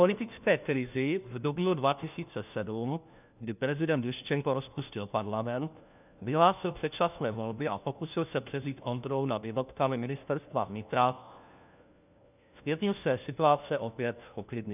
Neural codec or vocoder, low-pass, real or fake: codec, 16 kHz, 1 kbps, FunCodec, trained on LibriTTS, 50 frames a second; 3.6 kHz; fake